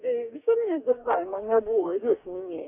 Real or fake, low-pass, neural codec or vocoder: fake; 3.6 kHz; codec, 44.1 kHz, 2.6 kbps, DAC